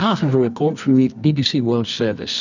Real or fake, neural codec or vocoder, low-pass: fake; codec, 24 kHz, 0.9 kbps, WavTokenizer, medium music audio release; 7.2 kHz